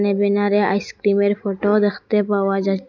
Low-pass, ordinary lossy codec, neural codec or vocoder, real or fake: 7.2 kHz; none; none; real